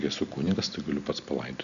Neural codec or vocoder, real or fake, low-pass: none; real; 7.2 kHz